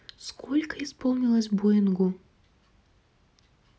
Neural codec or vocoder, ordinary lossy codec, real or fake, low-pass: none; none; real; none